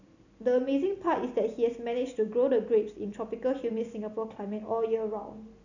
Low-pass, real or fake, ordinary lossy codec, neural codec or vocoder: 7.2 kHz; real; none; none